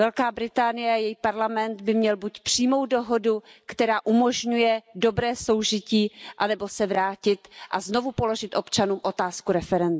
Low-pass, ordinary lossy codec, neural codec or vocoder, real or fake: none; none; none; real